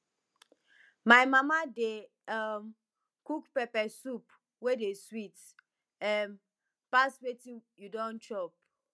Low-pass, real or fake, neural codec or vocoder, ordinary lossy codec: none; real; none; none